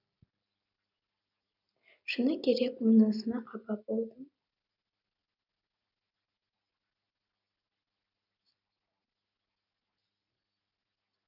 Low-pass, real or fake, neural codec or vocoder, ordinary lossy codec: 5.4 kHz; real; none; none